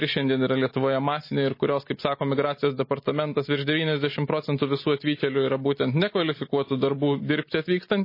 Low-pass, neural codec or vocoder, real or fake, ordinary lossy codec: 5.4 kHz; none; real; MP3, 24 kbps